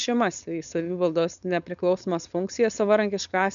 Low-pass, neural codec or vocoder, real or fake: 7.2 kHz; codec, 16 kHz, 4.8 kbps, FACodec; fake